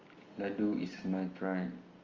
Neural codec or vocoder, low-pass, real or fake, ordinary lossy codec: none; 7.2 kHz; real; Opus, 32 kbps